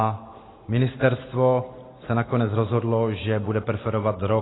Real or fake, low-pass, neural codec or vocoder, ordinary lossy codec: real; 7.2 kHz; none; AAC, 16 kbps